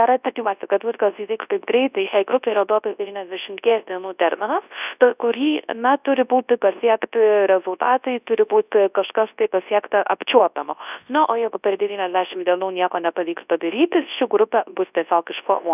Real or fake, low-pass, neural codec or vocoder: fake; 3.6 kHz; codec, 24 kHz, 0.9 kbps, WavTokenizer, large speech release